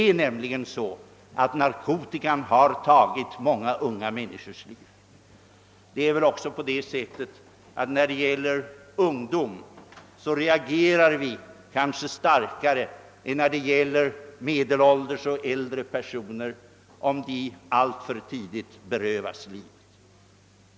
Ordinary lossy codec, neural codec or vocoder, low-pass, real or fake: none; none; none; real